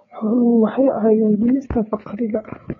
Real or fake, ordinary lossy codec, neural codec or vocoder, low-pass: fake; AAC, 32 kbps; codec, 16 kHz, 4 kbps, FreqCodec, larger model; 7.2 kHz